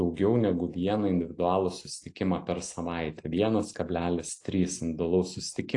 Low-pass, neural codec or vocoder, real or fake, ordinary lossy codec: 10.8 kHz; none; real; AAC, 48 kbps